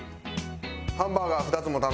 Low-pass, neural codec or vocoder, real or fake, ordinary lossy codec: none; none; real; none